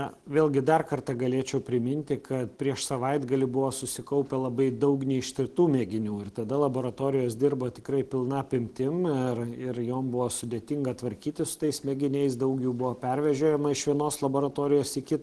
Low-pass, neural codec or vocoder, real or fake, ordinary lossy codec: 10.8 kHz; none; real; Opus, 16 kbps